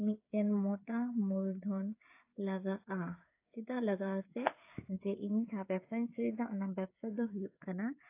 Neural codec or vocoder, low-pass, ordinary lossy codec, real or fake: codec, 16 kHz, 8 kbps, FreqCodec, smaller model; 3.6 kHz; AAC, 24 kbps; fake